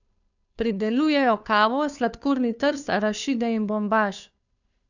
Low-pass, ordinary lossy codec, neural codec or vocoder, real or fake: 7.2 kHz; none; codec, 16 kHz, 2 kbps, FunCodec, trained on Chinese and English, 25 frames a second; fake